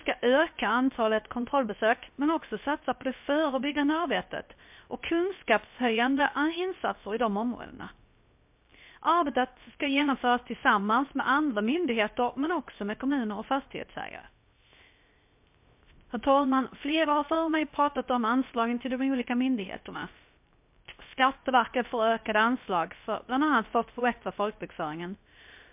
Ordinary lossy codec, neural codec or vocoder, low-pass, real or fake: MP3, 32 kbps; codec, 16 kHz, 0.3 kbps, FocalCodec; 3.6 kHz; fake